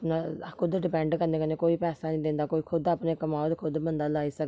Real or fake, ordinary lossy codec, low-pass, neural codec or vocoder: real; none; none; none